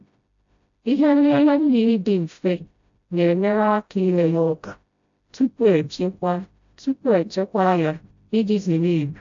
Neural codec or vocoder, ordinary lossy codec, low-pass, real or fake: codec, 16 kHz, 0.5 kbps, FreqCodec, smaller model; AAC, 64 kbps; 7.2 kHz; fake